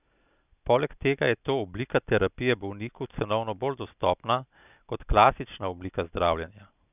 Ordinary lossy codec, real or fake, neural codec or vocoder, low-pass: none; real; none; 3.6 kHz